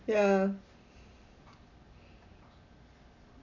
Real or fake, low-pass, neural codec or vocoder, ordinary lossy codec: real; 7.2 kHz; none; none